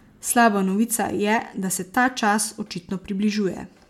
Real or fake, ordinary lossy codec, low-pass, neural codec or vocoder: real; MP3, 96 kbps; 19.8 kHz; none